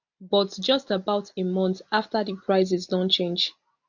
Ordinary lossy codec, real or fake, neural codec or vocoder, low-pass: none; real; none; 7.2 kHz